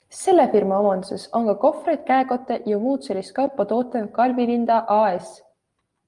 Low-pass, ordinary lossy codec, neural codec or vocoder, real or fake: 10.8 kHz; Opus, 32 kbps; none; real